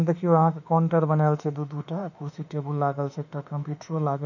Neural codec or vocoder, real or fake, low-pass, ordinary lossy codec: autoencoder, 48 kHz, 32 numbers a frame, DAC-VAE, trained on Japanese speech; fake; 7.2 kHz; none